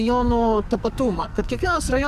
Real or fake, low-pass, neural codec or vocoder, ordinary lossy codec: fake; 14.4 kHz; codec, 44.1 kHz, 2.6 kbps, SNAC; MP3, 96 kbps